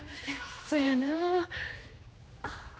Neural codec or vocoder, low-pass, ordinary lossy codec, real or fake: codec, 16 kHz, 2 kbps, X-Codec, HuBERT features, trained on general audio; none; none; fake